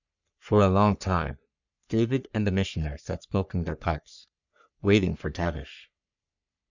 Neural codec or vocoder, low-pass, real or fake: codec, 44.1 kHz, 3.4 kbps, Pupu-Codec; 7.2 kHz; fake